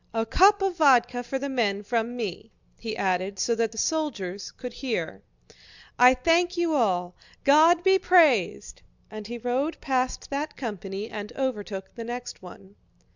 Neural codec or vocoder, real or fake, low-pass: none; real; 7.2 kHz